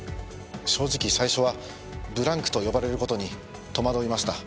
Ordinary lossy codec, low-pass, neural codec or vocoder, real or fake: none; none; none; real